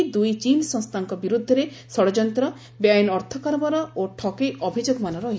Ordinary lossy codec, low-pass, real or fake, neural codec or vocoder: none; none; real; none